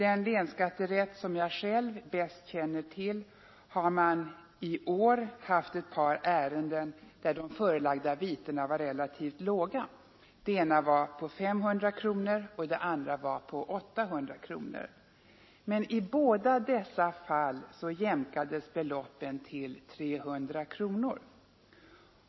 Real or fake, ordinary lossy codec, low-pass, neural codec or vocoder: real; MP3, 24 kbps; 7.2 kHz; none